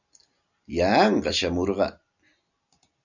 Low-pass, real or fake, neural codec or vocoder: 7.2 kHz; real; none